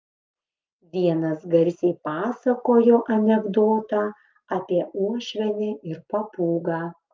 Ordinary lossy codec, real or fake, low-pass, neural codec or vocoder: Opus, 24 kbps; real; 7.2 kHz; none